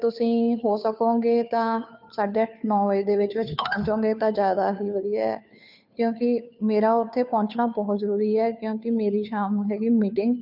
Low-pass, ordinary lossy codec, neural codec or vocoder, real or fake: 5.4 kHz; none; codec, 16 kHz, 2 kbps, FunCodec, trained on Chinese and English, 25 frames a second; fake